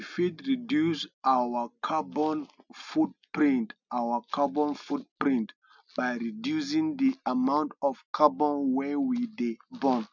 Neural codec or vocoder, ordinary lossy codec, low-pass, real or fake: none; none; 7.2 kHz; real